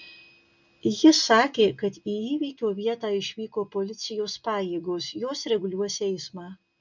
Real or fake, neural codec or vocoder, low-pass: real; none; 7.2 kHz